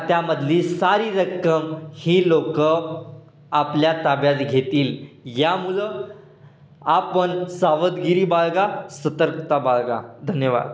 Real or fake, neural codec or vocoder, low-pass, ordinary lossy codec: real; none; none; none